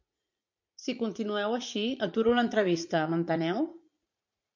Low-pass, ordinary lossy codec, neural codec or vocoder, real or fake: 7.2 kHz; MP3, 48 kbps; vocoder, 44.1 kHz, 80 mel bands, Vocos; fake